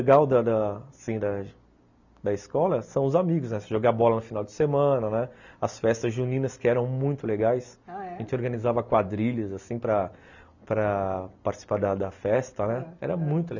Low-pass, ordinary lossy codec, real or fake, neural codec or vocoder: 7.2 kHz; none; real; none